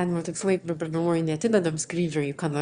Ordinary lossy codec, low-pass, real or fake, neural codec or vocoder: Opus, 64 kbps; 9.9 kHz; fake; autoencoder, 22.05 kHz, a latent of 192 numbers a frame, VITS, trained on one speaker